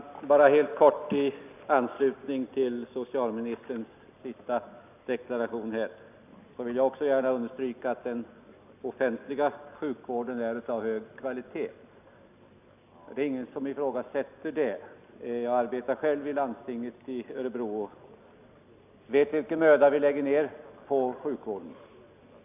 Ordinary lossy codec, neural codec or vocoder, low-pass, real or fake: none; none; 3.6 kHz; real